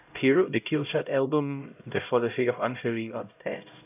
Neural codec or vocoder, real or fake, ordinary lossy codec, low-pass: codec, 16 kHz, 0.5 kbps, X-Codec, HuBERT features, trained on LibriSpeech; fake; none; 3.6 kHz